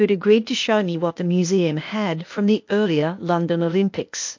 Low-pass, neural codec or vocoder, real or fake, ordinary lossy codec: 7.2 kHz; codec, 16 kHz, 0.8 kbps, ZipCodec; fake; MP3, 48 kbps